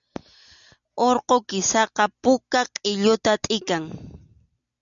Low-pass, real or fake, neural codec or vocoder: 7.2 kHz; real; none